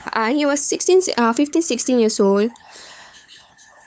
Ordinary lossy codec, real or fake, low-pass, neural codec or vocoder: none; fake; none; codec, 16 kHz, 8 kbps, FunCodec, trained on LibriTTS, 25 frames a second